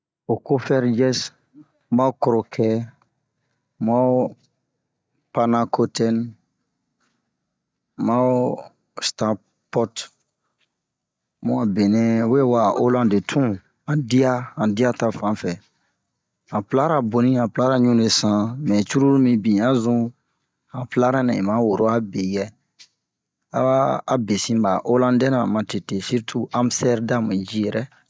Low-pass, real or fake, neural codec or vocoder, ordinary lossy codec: none; real; none; none